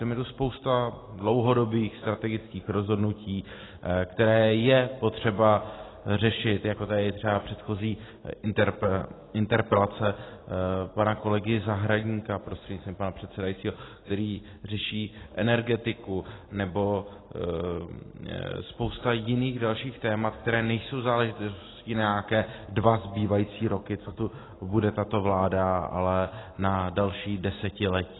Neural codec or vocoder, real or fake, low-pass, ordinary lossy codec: none; real; 7.2 kHz; AAC, 16 kbps